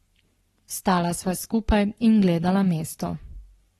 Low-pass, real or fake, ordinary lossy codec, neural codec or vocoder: 19.8 kHz; fake; AAC, 32 kbps; codec, 44.1 kHz, 7.8 kbps, Pupu-Codec